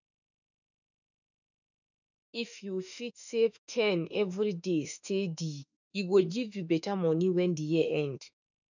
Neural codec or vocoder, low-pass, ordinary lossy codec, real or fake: autoencoder, 48 kHz, 32 numbers a frame, DAC-VAE, trained on Japanese speech; 7.2 kHz; none; fake